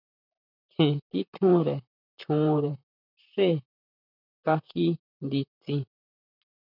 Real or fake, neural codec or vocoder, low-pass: fake; vocoder, 44.1 kHz, 128 mel bands, Pupu-Vocoder; 5.4 kHz